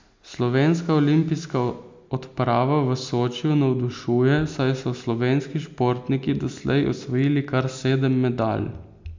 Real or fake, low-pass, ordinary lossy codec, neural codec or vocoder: real; 7.2 kHz; MP3, 64 kbps; none